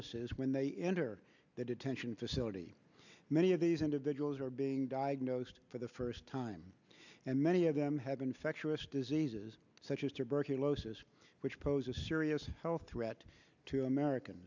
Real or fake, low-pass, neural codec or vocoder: real; 7.2 kHz; none